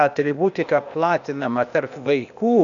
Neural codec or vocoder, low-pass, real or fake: codec, 16 kHz, 0.8 kbps, ZipCodec; 7.2 kHz; fake